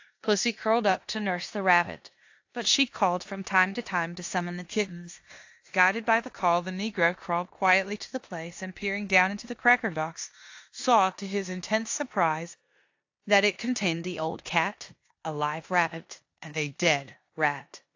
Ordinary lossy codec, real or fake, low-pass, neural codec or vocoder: AAC, 48 kbps; fake; 7.2 kHz; codec, 16 kHz in and 24 kHz out, 0.9 kbps, LongCat-Audio-Codec, four codebook decoder